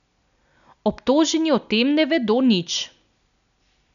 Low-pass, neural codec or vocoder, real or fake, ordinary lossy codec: 7.2 kHz; none; real; none